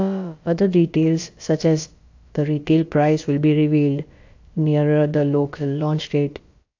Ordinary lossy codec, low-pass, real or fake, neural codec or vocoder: AAC, 48 kbps; 7.2 kHz; fake; codec, 16 kHz, about 1 kbps, DyCAST, with the encoder's durations